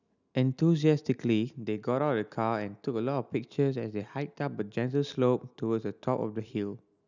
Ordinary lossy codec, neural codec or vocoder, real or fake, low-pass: none; none; real; 7.2 kHz